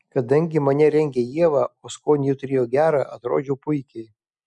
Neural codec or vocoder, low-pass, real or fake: none; 10.8 kHz; real